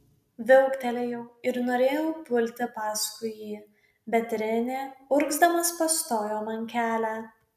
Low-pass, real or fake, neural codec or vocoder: 14.4 kHz; real; none